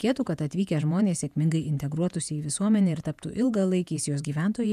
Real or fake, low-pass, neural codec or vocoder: fake; 14.4 kHz; vocoder, 48 kHz, 128 mel bands, Vocos